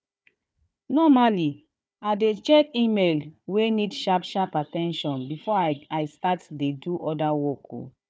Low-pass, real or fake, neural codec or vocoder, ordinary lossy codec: none; fake; codec, 16 kHz, 4 kbps, FunCodec, trained on Chinese and English, 50 frames a second; none